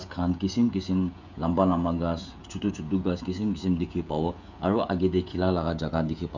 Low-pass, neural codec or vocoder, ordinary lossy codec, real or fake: 7.2 kHz; codec, 16 kHz, 16 kbps, FreqCodec, smaller model; none; fake